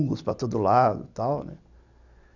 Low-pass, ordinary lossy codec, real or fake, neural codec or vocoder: 7.2 kHz; none; real; none